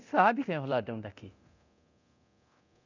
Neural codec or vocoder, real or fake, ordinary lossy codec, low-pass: codec, 24 kHz, 0.9 kbps, DualCodec; fake; none; 7.2 kHz